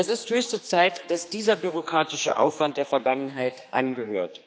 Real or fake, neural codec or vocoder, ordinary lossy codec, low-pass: fake; codec, 16 kHz, 2 kbps, X-Codec, HuBERT features, trained on general audio; none; none